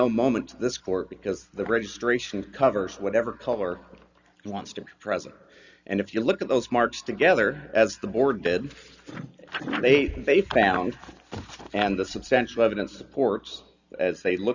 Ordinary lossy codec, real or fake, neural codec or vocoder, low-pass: Opus, 64 kbps; real; none; 7.2 kHz